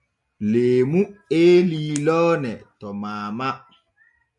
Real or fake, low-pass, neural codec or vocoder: real; 10.8 kHz; none